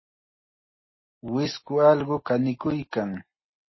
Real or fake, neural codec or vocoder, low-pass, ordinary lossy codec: real; none; 7.2 kHz; MP3, 24 kbps